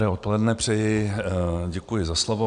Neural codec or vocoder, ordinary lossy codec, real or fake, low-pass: none; MP3, 96 kbps; real; 9.9 kHz